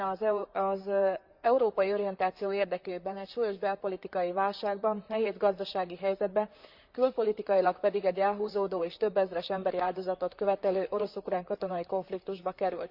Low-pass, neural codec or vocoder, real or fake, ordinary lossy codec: 5.4 kHz; vocoder, 44.1 kHz, 128 mel bands, Pupu-Vocoder; fake; Opus, 64 kbps